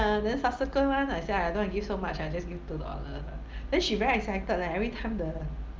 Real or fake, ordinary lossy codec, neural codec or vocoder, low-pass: real; Opus, 32 kbps; none; 7.2 kHz